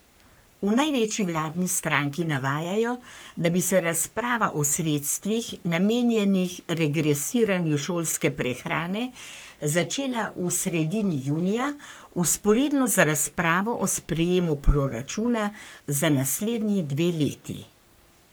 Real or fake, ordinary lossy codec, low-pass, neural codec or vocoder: fake; none; none; codec, 44.1 kHz, 3.4 kbps, Pupu-Codec